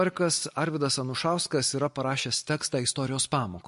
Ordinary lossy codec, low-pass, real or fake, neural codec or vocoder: MP3, 48 kbps; 14.4 kHz; real; none